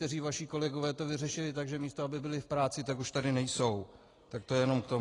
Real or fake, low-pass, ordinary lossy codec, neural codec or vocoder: real; 10.8 kHz; AAC, 32 kbps; none